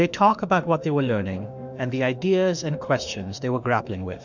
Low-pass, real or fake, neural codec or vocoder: 7.2 kHz; fake; autoencoder, 48 kHz, 32 numbers a frame, DAC-VAE, trained on Japanese speech